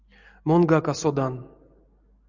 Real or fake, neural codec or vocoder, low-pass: real; none; 7.2 kHz